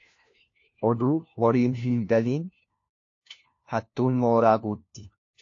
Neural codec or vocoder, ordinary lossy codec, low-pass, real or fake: codec, 16 kHz, 1 kbps, FunCodec, trained on LibriTTS, 50 frames a second; AAC, 48 kbps; 7.2 kHz; fake